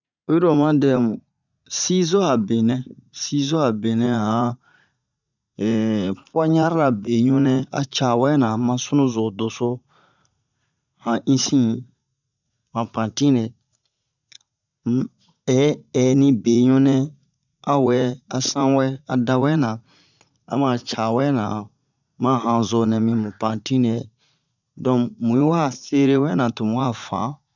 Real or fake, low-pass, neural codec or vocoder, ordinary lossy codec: fake; 7.2 kHz; vocoder, 44.1 kHz, 80 mel bands, Vocos; none